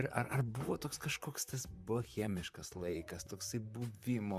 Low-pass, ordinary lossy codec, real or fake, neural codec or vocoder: 14.4 kHz; MP3, 96 kbps; fake; vocoder, 44.1 kHz, 128 mel bands, Pupu-Vocoder